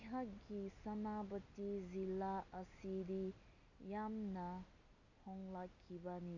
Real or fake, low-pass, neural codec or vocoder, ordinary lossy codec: real; 7.2 kHz; none; none